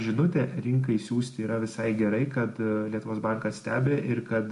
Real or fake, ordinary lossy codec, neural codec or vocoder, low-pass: real; MP3, 48 kbps; none; 10.8 kHz